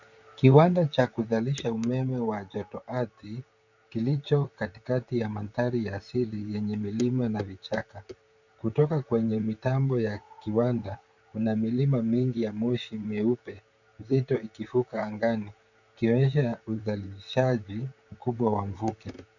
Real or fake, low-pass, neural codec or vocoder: fake; 7.2 kHz; vocoder, 44.1 kHz, 128 mel bands, Pupu-Vocoder